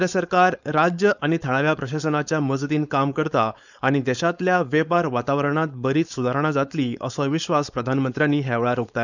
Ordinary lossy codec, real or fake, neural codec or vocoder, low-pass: none; fake; codec, 16 kHz, 4.8 kbps, FACodec; 7.2 kHz